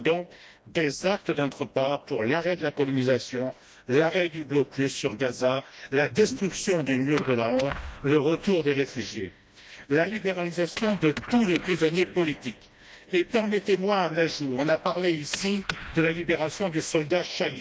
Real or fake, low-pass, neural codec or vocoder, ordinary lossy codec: fake; none; codec, 16 kHz, 1 kbps, FreqCodec, smaller model; none